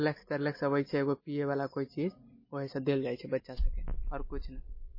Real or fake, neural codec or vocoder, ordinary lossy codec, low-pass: real; none; MP3, 24 kbps; 5.4 kHz